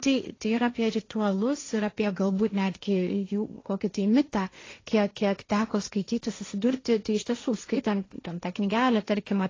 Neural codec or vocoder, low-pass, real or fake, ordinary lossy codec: codec, 16 kHz, 1.1 kbps, Voila-Tokenizer; 7.2 kHz; fake; AAC, 32 kbps